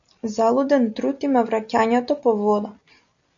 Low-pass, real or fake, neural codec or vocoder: 7.2 kHz; real; none